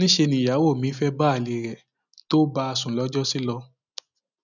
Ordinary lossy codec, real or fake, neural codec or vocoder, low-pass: none; real; none; 7.2 kHz